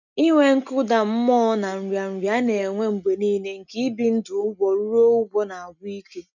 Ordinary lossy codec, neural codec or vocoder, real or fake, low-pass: none; none; real; 7.2 kHz